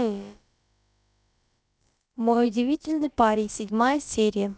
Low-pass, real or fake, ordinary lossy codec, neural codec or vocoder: none; fake; none; codec, 16 kHz, about 1 kbps, DyCAST, with the encoder's durations